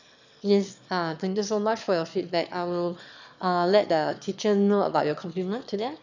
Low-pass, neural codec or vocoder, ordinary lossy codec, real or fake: 7.2 kHz; autoencoder, 22.05 kHz, a latent of 192 numbers a frame, VITS, trained on one speaker; none; fake